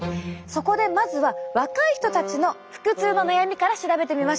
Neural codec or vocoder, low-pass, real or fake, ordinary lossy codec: none; none; real; none